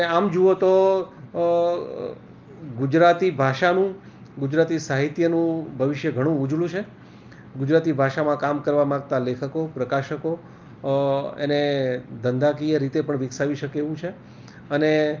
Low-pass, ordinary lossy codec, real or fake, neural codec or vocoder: 7.2 kHz; Opus, 24 kbps; real; none